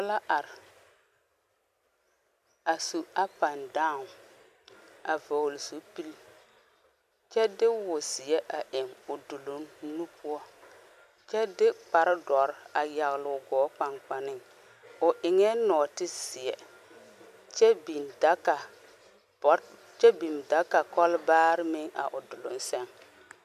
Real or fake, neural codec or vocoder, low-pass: real; none; 14.4 kHz